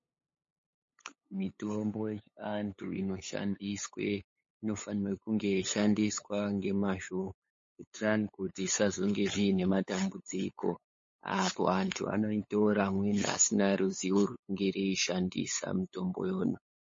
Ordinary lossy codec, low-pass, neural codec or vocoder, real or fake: MP3, 32 kbps; 7.2 kHz; codec, 16 kHz, 8 kbps, FunCodec, trained on LibriTTS, 25 frames a second; fake